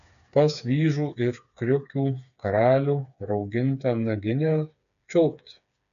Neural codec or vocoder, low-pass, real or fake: codec, 16 kHz, 4 kbps, FreqCodec, smaller model; 7.2 kHz; fake